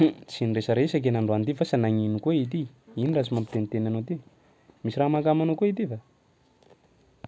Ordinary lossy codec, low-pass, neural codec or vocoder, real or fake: none; none; none; real